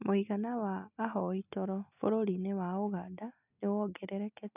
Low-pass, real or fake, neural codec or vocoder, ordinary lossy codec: 3.6 kHz; real; none; none